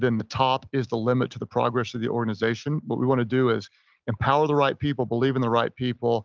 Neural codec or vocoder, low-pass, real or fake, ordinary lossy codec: none; 7.2 kHz; real; Opus, 24 kbps